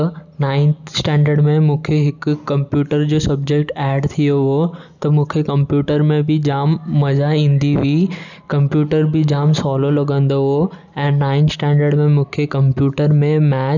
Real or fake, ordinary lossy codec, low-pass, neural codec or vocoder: real; none; 7.2 kHz; none